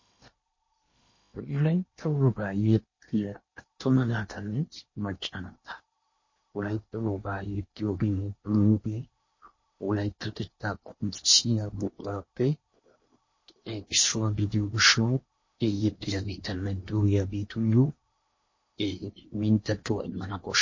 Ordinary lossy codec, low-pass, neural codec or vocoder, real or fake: MP3, 32 kbps; 7.2 kHz; codec, 16 kHz in and 24 kHz out, 0.8 kbps, FocalCodec, streaming, 65536 codes; fake